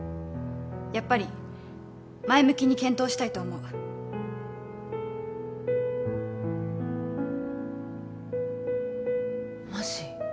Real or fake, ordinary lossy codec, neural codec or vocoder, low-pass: real; none; none; none